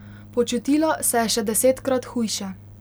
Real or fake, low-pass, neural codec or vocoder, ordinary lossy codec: real; none; none; none